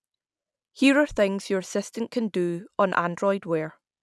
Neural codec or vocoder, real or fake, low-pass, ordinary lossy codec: none; real; none; none